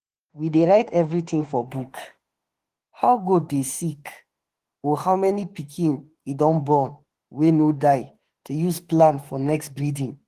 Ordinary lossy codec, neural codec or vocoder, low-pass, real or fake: Opus, 16 kbps; autoencoder, 48 kHz, 32 numbers a frame, DAC-VAE, trained on Japanese speech; 14.4 kHz; fake